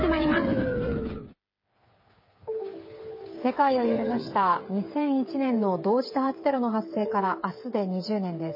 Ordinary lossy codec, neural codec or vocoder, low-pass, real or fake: MP3, 24 kbps; codec, 16 kHz, 8 kbps, FreqCodec, smaller model; 5.4 kHz; fake